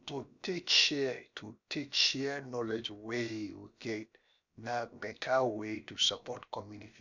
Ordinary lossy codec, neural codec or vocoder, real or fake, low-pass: none; codec, 16 kHz, about 1 kbps, DyCAST, with the encoder's durations; fake; 7.2 kHz